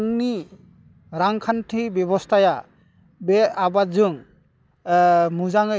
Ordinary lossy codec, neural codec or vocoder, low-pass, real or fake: none; none; none; real